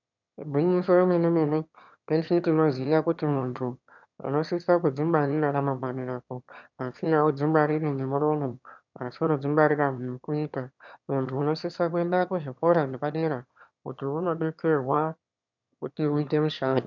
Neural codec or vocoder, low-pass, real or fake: autoencoder, 22.05 kHz, a latent of 192 numbers a frame, VITS, trained on one speaker; 7.2 kHz; fake